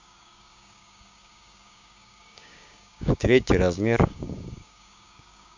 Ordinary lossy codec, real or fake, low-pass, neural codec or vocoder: MP3, 64 kbps; fake; 7.2 kHz; codec, 16 kHz, 6 kbps, DAC